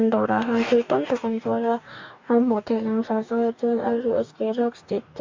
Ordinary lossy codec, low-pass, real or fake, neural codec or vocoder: MP3, 64 kbps; 7.2 kHz; fake; codec, 44.1 kHz, 2.6 kbps, DAC